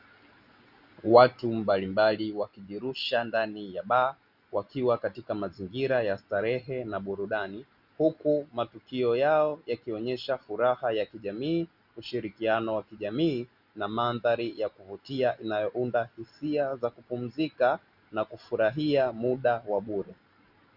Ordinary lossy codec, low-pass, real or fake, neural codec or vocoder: Opus, 64 kbps; 5.4 kHz; real; none